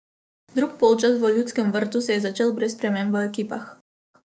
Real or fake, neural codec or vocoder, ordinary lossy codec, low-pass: fake; codec, 16 kHz, 6 kbps, DAC; none; none